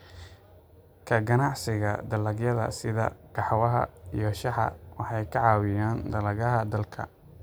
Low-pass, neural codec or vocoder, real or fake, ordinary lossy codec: none; none; real; none